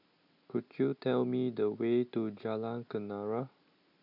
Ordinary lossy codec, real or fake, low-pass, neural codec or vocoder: none; real; 5.4 kHz; none